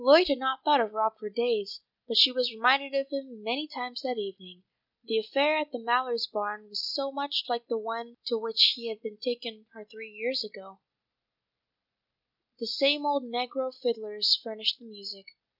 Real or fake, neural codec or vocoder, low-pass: real; none; 5.4 kHz